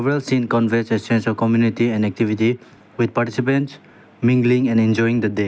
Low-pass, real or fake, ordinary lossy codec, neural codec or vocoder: none; real; none; none